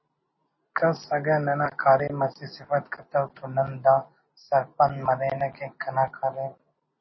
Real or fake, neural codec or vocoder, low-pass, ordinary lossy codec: real; none; 7.2 kHz; MP3, 24 kbps